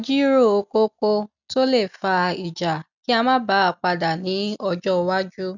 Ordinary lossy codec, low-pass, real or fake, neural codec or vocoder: none; 7.2 kHz; real; none